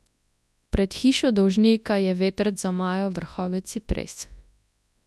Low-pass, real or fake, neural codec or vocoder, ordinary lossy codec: none; fake; codec, 24 kHz, 0.9 kbps, WavTokenizer, large speech release; none